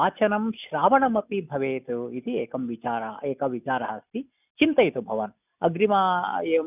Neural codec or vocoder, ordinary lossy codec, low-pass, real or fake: none; none; 3.6 kHz; real